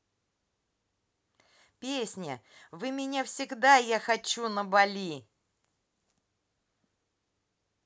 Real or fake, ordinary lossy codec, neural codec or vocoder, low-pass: real; none; none; none